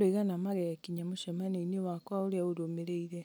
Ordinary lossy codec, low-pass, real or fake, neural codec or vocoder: none; none; real; none